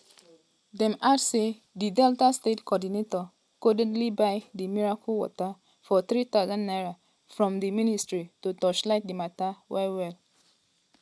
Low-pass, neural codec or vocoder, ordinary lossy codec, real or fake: none; none; none; real